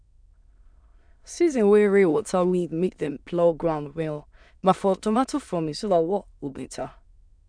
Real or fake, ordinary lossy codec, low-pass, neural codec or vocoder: fake; none; 9.9 kHz; autoencoder, 22.05 kHz, a latent of 192 numbers a frame, VITS, trained on many speakers